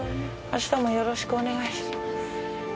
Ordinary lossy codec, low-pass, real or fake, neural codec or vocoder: none; none; real; none